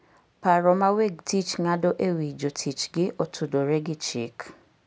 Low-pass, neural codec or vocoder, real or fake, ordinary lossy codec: none; none; real; none